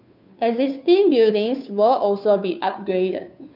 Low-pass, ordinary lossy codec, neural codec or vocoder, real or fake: 5.4 kHz; none; codec, 16 kHz, 2 kbps, FunCodec, trained on Chinese and English, 25 frames a second; fake